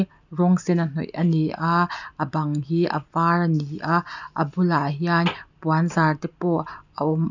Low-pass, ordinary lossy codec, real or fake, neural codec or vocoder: 7.2 kHz; none; real; none